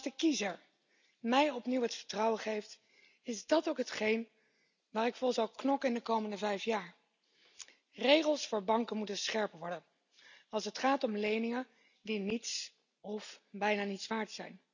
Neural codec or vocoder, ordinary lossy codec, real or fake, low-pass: none; none; real; 7.2 kHz